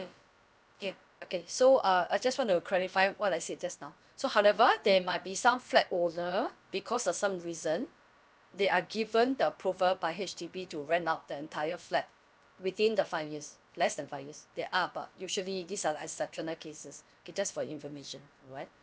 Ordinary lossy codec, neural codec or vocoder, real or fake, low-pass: none; codec, 16 kHz, about 1 kbps, DyCAST, with the encoder's durations; fake; none